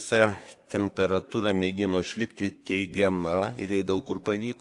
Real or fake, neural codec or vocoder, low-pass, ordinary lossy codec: fake; codec, 24 kHz, 1 kbps, SNAC; 10.8 kHz; AAC, 64 kbps